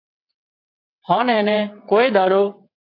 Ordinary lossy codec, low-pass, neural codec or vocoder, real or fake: AAC, 32 kbps; 5.4 kHz; vocoder, 22.05 kHz, 80 mel bands, WaveNeXt; fake